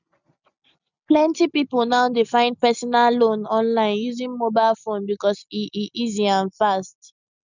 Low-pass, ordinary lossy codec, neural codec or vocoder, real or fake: 7.2 kHz; none; none; real